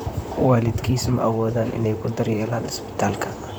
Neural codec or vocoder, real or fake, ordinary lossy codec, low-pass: vocoder, 44.1 kHz, 128 mel bands, Pupu-Vocoder; fake; none; none